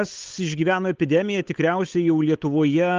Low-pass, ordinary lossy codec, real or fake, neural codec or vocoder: 7.2 kHz; Opus, 24 kbps; fake; codec, 16 kHz, 8 kbps, FunCodec, trained on Chinese and English, 25 frames a second